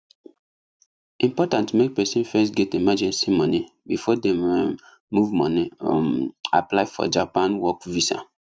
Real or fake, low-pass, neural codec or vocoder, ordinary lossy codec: real; none; none; none